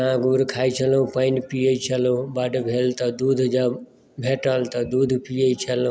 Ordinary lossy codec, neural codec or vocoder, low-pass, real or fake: none; none; none; real